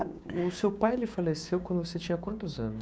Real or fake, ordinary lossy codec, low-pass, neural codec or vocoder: fake; none; none; codec, 16 kHz, 6 kbps, DAC